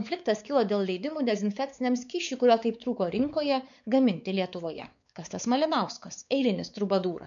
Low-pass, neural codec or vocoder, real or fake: 7.2 kHz; codec, 16 kHz, 4 kbps, X-Codec, WavLM features, trained on Multilingual LibriSpeech; fake